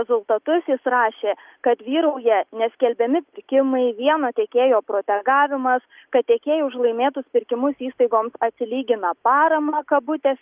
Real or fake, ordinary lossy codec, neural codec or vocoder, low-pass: real; Opus, 24 kbps; none; 3.6 kHz